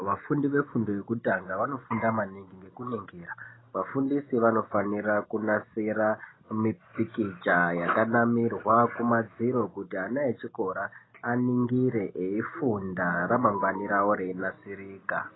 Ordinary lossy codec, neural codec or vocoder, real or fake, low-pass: AAC, 16 kbps; none; real; 7.2 kHz